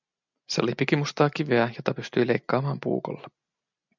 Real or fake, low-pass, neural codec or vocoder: real; 7.2 kHz; none